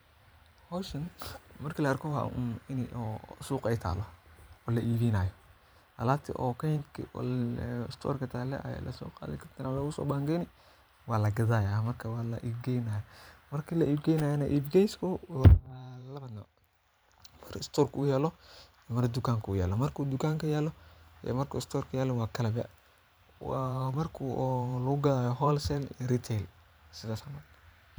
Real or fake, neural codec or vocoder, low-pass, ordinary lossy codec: fake; vocoder, 44.1 kHz, 128 mel bands every 512 samples, BigVGAN v2; none; none